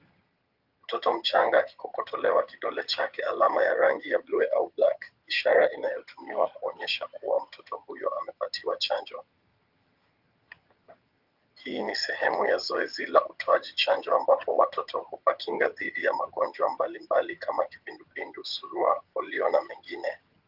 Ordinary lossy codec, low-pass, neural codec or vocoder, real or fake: Opus, 24 kbps; 5.4 kHz; vocoder, 22.05 kHz, 80 mel bands, HiFi-GAN; fake